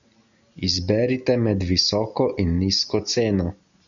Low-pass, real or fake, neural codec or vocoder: 7.2 kHz; real; none